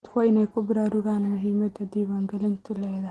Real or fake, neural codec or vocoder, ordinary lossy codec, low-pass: real; none; Opus, 16 kbps; 10.8 kHz